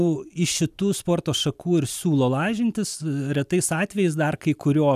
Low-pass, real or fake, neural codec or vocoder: 14.4 kHz; real; none